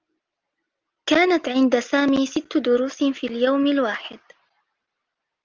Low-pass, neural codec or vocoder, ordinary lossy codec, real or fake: 7.2 kHz; none; Opus, 24 kbps; real